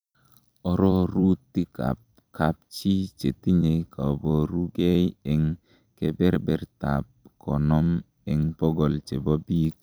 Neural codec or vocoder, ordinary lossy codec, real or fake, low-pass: vocoder, 44.1 kHz, 128 mel bands every 256 samples, BigVGAN v2; none; fake; none